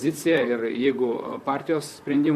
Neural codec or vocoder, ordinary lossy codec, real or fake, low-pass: vocoder, 44.1 kHz, 128 mel bands, Pupu-Vocoder; MP3, 64 kbps; fake; 14.4 kHz